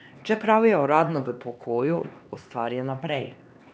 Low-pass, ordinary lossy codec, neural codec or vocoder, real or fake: none; none; codec, 16 kHz, 2 kbps, X-Codec, HuBERT features, trained on LibriSpeech; fake